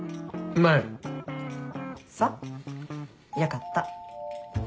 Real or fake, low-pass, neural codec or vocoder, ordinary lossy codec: real; none; none; none